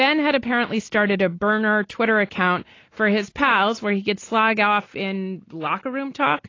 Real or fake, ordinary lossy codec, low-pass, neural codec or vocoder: real; AAC, 32 kbps; 7.2 kHz; none